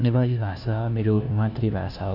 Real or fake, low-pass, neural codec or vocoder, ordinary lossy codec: fake; 5.4 kHz; codec, 16 kHz, 1 kbps, FunCodec, trained on LibriTTS, 50 frames a second; none